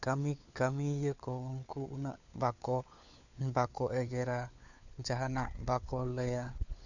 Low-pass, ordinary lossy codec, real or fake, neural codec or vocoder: 7.2 kHz; none; fake; codec, 16 kHz, 4 kbps, FunCodec, trained on LibriTTS, 50 frames a second